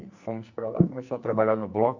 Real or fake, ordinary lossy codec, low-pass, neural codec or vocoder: fake; Opus, 64 kbps; 7.2 kHz; codec, 44.1 kHz, 2.6 kbps, SNAC